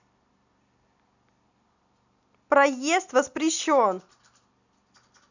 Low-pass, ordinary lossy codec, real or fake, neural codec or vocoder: 7.2 kHz; none; real; none